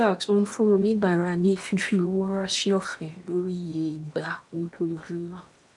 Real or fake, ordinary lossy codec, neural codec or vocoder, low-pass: fake; none; codec, 16 kHz in and 24 kHz out, 0.8 kbps, FocalCodec, streaming, 65536 codes; 10.8 kHz